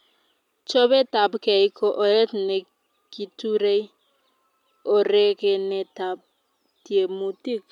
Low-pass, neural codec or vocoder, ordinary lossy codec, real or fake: 19.8 kHz; none; none; real